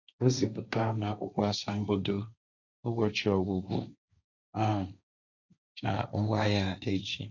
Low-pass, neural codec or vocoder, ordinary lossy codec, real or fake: 7.2 kHz; codec, 16 kHz, 1.1 kbps, Voila-Tokenizer; none; fake